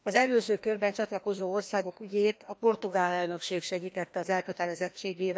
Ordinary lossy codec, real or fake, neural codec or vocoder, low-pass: none; fake; codec, 16 kHz, 1 kbps, FreqCodec, larger model; none